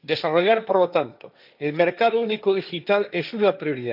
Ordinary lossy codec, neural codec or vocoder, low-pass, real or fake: none; codec, 16 kHz, 1.1 kbps, Voila-Tokenizer; 5.4 kHz; fake